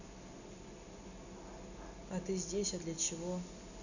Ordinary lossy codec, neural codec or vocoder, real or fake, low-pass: none; none; real; 7.2 kHz